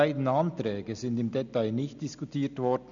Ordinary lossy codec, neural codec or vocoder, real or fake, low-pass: none; none; real; 7.2 kHz